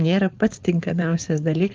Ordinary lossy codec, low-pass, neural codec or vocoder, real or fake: Opus, 32 kbps; 7.2 kHz; codec, 16 kHz, 4.8 kbps, FACodec; fake